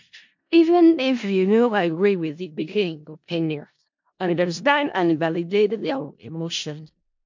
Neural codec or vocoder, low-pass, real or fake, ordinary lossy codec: codec, 16 kHz in and 24 kHz out, 0.4 kbps, LongCat-Audio-Codec, four codebook decoder; 7.2 kHz; fake; MP3, 48 kbps